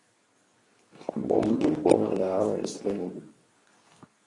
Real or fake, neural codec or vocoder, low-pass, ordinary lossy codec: fake; codec, 24 kHz, 0.9 kbps, WavTokenizer, medium speech release version 2; 10.8 kHz; AAC, 32 kbps